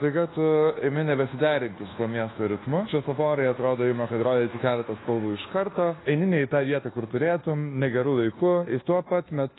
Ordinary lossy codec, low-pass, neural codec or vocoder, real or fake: AAC, 16 kbps; 7.2 kHz; codec, 24 kHz, 1.2 kbps, DualCodec; fake